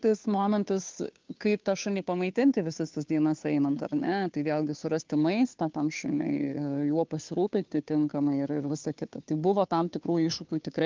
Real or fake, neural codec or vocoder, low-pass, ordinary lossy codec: fake; codec, 16 kHz, 2 kbps, FunCodec, trained on Chinese and English, 25 frames a second; 7.2 kHz; Opus, 24 kbps